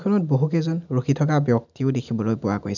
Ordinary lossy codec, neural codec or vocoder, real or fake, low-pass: none; none; real; 7.2 kHz